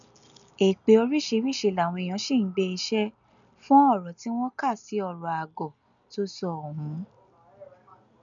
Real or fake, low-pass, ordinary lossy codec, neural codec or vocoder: real; 7.2 kHz; none; none